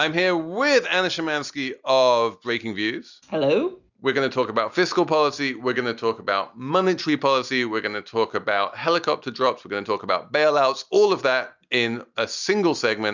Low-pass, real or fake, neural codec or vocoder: 7.2 kHz; real; none